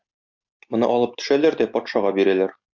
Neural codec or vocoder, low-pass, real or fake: none; 7.2 kHz; real